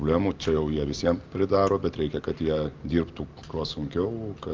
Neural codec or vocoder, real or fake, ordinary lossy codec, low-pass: none; real; Opus, 32 kbps; 7.2 kHz